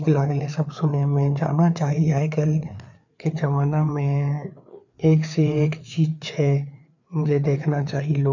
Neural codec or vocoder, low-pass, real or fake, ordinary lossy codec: codec, 16 kHz, 4 kbps, FreqCodec, larger model; 7.2 kHz; fake; none